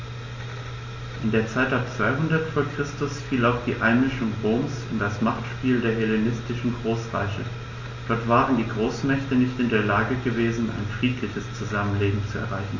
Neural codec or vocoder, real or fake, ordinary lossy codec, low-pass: none; real; MP3, 32 kbps; 7.2 kHz